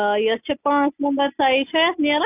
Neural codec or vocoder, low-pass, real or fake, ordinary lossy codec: none; 3.6 kHz; real; AAC, 32 kbps